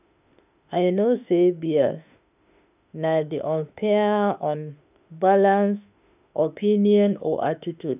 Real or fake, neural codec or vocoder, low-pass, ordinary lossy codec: fake; autoencoder, 48 kHz, 32 numbers a frame, DAC-VAE, trained on Japanese speech; 3.6 kHz; none